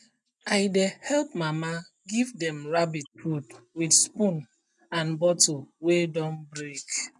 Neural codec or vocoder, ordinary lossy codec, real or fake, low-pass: none; none; real; 10.8 kHz